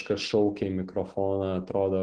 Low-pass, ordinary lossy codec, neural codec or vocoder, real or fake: 9.9 kHz; Opus, 16 kbps; none; real